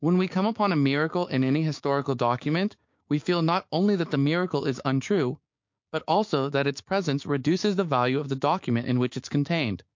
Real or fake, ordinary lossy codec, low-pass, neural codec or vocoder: fake; MP3, 48 kbps; 7.2 kHz; codec, 16 kHz, 6 kbps, DAC